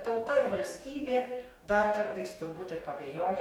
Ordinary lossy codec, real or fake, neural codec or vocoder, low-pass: MP3, 96 kbps; fake; codec, 44.1 kHz, 2.6 kbps, DAC; 19.8 kHz